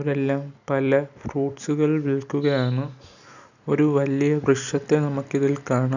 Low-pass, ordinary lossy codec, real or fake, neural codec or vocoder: 7.2 kHz; none; real; none